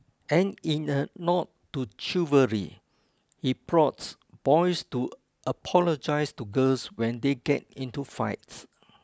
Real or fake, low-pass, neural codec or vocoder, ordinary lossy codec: real; none; none; none